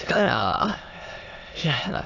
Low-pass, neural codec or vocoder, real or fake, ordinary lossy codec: 7.2 kHz; autoencoder, 22.05 kHz, a latent of 192 numbers a frame, VITS, trained on many speakers; fake; AAC, 48 kbps